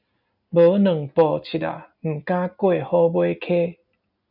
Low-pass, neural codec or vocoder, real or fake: 5.4 kHz; none; real